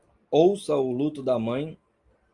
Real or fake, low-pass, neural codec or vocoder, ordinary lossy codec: real; 10.8 kHz; none; Opus, 32 kbps